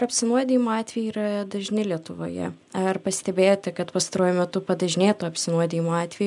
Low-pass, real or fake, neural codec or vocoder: 10.8 kHz; real; none